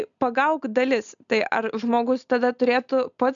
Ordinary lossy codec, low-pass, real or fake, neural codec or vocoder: MP3, 96 kbps; 7.2 kHz; real; none